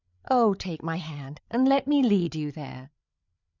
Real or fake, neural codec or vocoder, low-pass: fake; codec, 16 kHz, 8 kbps, FreqCodec, larger model; 7.2 kHz